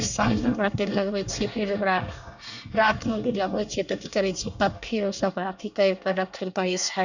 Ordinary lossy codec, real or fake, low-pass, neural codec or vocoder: none; fake; 7.2 kHz; codec, 24 kHz, 1 kbps, SNAC